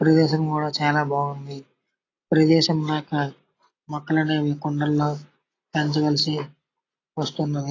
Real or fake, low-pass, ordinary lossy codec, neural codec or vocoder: real; 7.2 kHz; AAC, 32 kbps; none